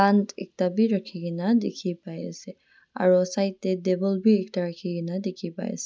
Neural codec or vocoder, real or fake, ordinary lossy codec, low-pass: none; real; none; none